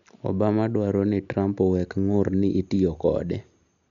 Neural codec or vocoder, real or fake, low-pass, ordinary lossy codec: none; real; 7.2 kHz; none